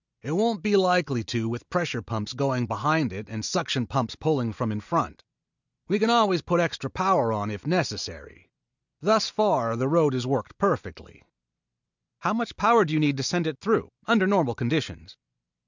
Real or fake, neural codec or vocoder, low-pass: real; none; 7.2 kHz